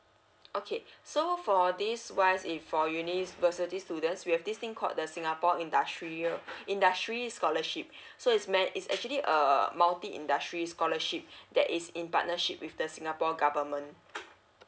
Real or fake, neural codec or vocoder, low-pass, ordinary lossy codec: real; none; none; none